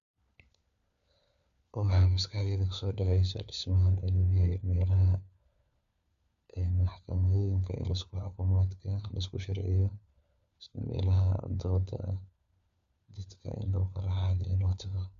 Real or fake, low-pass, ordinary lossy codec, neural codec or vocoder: fake; 7.2 kHz; Opus, 64 kbps; codec, 16 kHz, 4 kbps, FunCodec, trained on LibriTTS, 50 frames a second